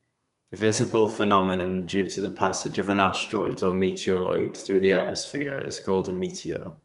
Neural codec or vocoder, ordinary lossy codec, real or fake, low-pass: codec, 24 kHz, 1 kbps, SNAC; none; fake; 10.8 kHz